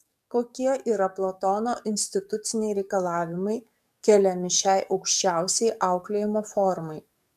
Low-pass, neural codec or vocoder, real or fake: 14.4 kHz; codec, 44.1 kHz, 7.8 kbps, DAC; fake